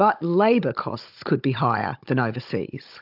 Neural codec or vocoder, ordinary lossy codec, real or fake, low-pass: codec, 16 kHz, 16 kbps, FunCodec, trained on Chinese and English, 50 frames a second; AAC, 48 kbps; fake; 5.4 kHz